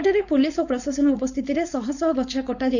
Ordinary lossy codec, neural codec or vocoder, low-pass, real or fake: none; codec, 16 kHz, 4.8 kbps, FACodec; 7.2 kHz; fake